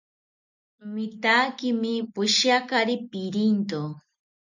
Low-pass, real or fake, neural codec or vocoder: 7.2 kHz; real; none